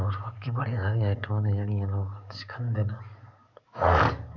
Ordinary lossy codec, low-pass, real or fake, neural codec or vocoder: none; 7.2 kHz; fake; codec, 44.1 kHz, 7.8 kbps, DAC